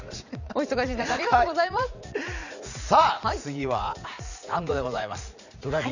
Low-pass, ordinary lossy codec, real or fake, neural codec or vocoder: 7.2 kHz; none; fake; vocoder, 44.1 kHz, 80 mel bands, Vocos